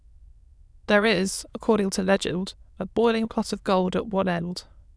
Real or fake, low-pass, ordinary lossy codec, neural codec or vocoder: fake; none; none; autoencoder, 22.05 kHz, a latent of 192 numbers a frame, VITS, trained on many speakers